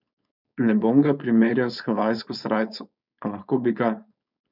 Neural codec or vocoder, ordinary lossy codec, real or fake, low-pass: codec, 16 kHz, 4.8 kbps, FACodec; none; fake; 5.4 kHz